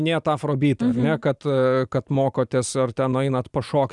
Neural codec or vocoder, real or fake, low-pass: none; real; 10.8 kHz